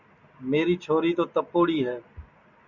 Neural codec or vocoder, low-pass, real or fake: none; 7.2 kHz; real